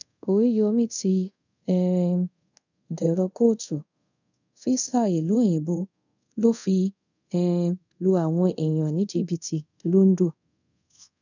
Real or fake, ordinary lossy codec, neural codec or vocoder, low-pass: fake; none; codec, 24 kHz, 0.5 kbps, DualCodec; 7.2 kHz